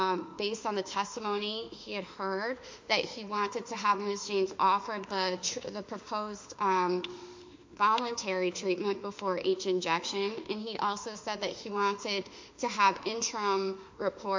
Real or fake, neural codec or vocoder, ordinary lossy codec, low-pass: fake; autoencoder, 48 kHz, 32 numbers a frame, DAC-VAE, trained on Japanese speech; MP3, 48 kbps; 7.2 kHz